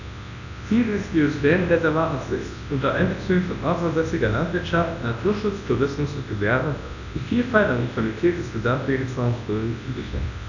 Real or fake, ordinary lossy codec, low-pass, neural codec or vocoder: fake; none; 7.2 kHz; codec, 24 kHz, 0.9 kbps, WavTokenizer, large speech release